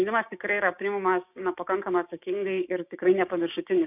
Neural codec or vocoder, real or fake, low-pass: codec, 16 kHz, 6 kbps, DAC; fake; 3.6 kHz